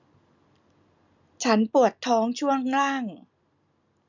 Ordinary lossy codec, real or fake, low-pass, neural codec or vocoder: none; real; 7.2 kHz; none